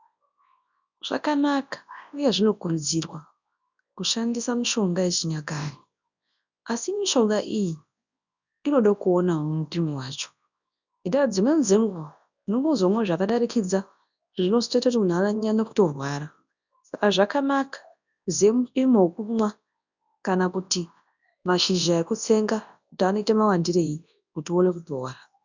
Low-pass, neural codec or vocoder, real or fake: 7.2 kHz; codec, 24 kHz, 0.9 kbps, WavTokenizer, large speech release; fake